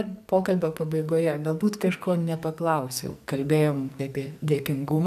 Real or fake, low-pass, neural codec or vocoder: fake; 14.4 kHz; codec, 44.1 kHz, 2.6 kbps, SNAC